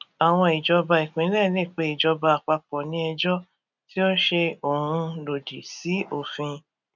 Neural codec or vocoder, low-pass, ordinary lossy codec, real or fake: none; 7.2 kHz; none; real